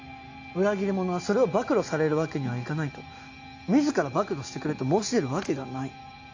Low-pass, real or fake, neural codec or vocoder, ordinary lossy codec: 7.2 kHz; real; none; AAC, 48 kbps